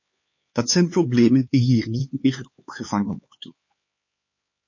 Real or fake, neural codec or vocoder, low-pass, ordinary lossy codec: fake; codec, 16 kHz, 4 kbps, X-Codec, HuBERT features, trained on LibriSpeech; 7.2 kHz; MP3, 32 kbps